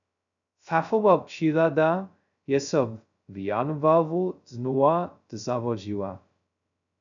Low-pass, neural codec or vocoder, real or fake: 7.2 kHz; codec, 16 kHz, 0.2 kbps, FocalCodec; fake